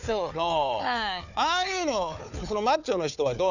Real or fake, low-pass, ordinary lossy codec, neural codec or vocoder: fake; 7.2 kHz; none; codec, 16 kHz, 4 kbps, FunCodec, trained on Chinese and English, 50 frames a second